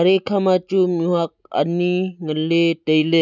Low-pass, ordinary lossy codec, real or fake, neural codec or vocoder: 7.2 kHz; none; real; none